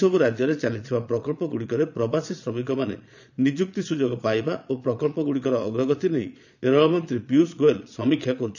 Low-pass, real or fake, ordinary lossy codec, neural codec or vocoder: 7.2 kHz; fake; none; vocoder, 22.05 kHz, 80 mel bands, Vocos